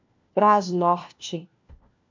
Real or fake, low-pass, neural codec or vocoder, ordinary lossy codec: fake; 7.2 kHz; codec, 16 kHz, 1 kbps, FunCodec, trained on LibriTTS, 50 frames a second; MP3, 64 kbps